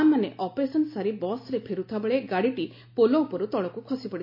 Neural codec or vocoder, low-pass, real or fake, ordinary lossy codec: none; 5.4 kHz; real; MP3, 48 kbps